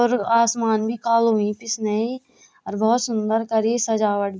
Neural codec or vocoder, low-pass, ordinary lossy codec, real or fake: none; none; none; real